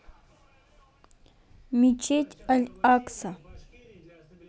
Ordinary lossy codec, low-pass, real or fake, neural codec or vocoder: none; none; real; none